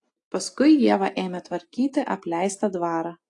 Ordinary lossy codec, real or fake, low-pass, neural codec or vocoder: AAC, 48 kbps; real; 10.8 kHz; none